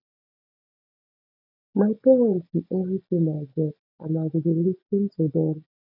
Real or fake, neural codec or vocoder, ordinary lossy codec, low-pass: real; none; none; 5.4 kHz